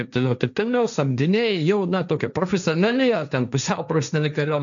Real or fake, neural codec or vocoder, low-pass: fake; codec, 16 kHz, 1.1 kbps, Voila-Tokenizer; 7.2 kHz